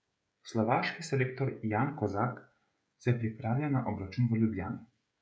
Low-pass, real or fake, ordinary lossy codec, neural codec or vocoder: none; fake; none; codec, 16 kHz, 16 kbps, FreqCodec, smaller model